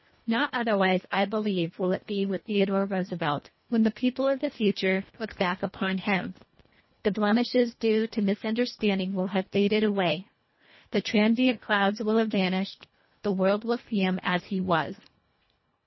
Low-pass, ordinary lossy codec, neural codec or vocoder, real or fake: 7.2 kHz; MP3, 24 kbps; codec, 24 kHz, 1.5 kbps, HILCodec; fake